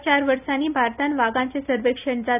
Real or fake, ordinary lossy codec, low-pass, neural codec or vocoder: real; none; 3.6 kHz; none